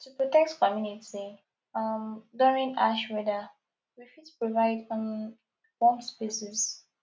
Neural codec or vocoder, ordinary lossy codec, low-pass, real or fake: none; none; none; real